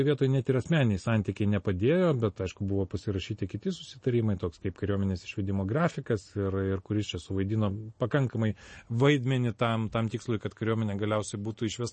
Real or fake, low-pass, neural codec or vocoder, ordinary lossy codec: real; 10.8 kHz; none; MP3, 32 kbps